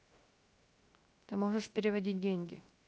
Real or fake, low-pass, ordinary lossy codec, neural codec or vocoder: fake; none; none; codec, 16 kHz, 0.7 kbps, FocalCodec